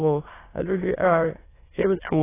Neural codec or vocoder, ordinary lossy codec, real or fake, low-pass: autoencoder, 22.05 kHz, a latent of 192 numbers a frame, VITS, trained on many speakers; AAC, 16 kbps; fake; 3.6 kHz